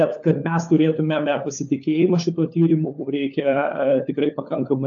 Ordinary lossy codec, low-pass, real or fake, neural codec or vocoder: AAC, 64 kbps; 7.2 kHz; fake; codec, 16 kHz, 4 kbps, FunCodec, trained on LibriTTS, 50 frames a second